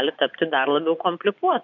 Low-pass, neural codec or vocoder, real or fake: 7.2 kHz; vocoder, 24 kHz, 100 mel bands, Vocos; fake